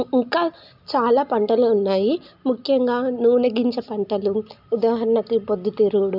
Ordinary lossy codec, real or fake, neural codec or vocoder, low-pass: none; real; none; 5.4 kHz